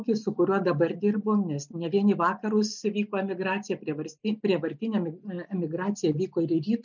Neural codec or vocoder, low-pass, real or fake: none; 7.2 kHz; real